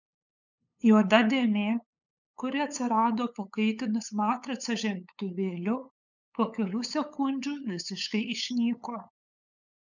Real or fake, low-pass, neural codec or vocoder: fake; 7.2 kHz; codec, 16 kHz, 8 kbps, FunCodec, trained on LibriTTS, 25 frames a second